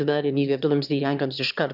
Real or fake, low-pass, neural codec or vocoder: fake; 5.4 kHz; autoencoder, 22.05 kHz, a latent of 192 numbers a frame, VITS, trained on one speaker